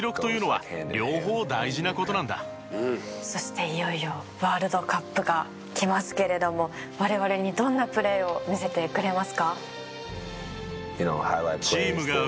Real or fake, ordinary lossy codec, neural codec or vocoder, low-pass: real; none; none; none